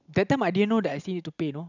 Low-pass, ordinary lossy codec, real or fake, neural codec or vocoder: 7.2 kHz; none; real; none